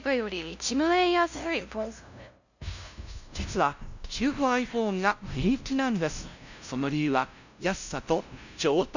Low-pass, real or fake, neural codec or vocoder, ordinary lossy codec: 7.2 kHz; fake; codec, 16 kHz, 0.5 kbps, FunCodec, trained on LibriTTS, 25 frames a second; none